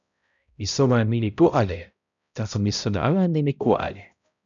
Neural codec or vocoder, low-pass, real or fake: codec, 16 kHz, 0.5 kbps, X-Codec, HuBERT features, trained on balanced general audio; 7.2 kHz; fake